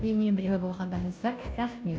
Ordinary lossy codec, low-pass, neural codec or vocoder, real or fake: none; none; codec, 16 kHz, 0.5 kbps, FunCodec, trained on Chinese and English, 25 frames a second; fake